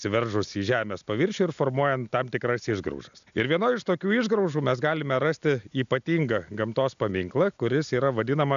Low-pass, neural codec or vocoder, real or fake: 7.2 kHz; none; real